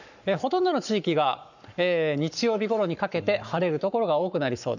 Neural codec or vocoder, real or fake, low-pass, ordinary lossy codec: codec, 44.1 kHz, 7.8 kbps, Pupu-Codec; fake; 7.2 kHz; none